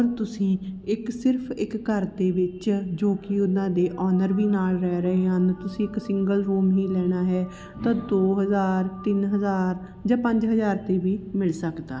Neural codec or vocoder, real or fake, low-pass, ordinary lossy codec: none; real; none; none